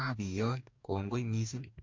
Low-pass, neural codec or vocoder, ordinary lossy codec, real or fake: 7.2 kHz; codec, 44.1 kHz, 2.6 kbps, DAC; MP3, 48 kbps; fake